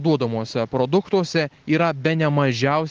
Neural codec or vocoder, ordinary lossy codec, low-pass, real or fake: none; Opus, 16 kbps; 7.2 kHz; real